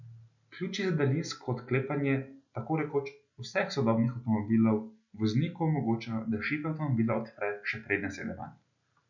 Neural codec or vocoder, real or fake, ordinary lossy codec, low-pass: none; real; none; 7.2 kHz